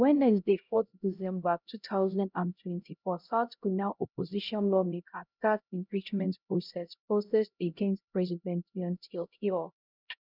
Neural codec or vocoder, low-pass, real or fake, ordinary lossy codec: codec, 16 kHz, 0.5 kbps, X-Codec, HuBERT features, trained on LibriSpeech; 5.4 kHz; fake; none